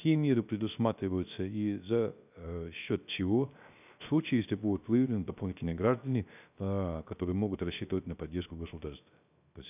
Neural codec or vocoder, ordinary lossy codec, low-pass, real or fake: codec, 16 kHz, 0.3 kbps, FocalCodec; none; 3.6 kHz; fake